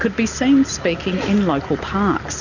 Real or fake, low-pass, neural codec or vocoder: real; 7.2 kHz; none